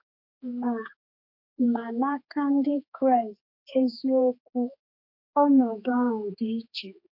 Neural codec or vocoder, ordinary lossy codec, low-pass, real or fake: codec, 16 kHz, 2 kbps, X-Codec, HuBERT features, trained on general audio; MP3, 32 kbps; 5.4 kHz; fake